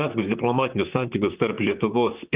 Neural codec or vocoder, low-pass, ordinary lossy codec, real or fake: vocoder, 44.1 kHz, 128 mel bands, Pupu-Vocoder; 3.6 kHz; Opus, 32 kbps; fake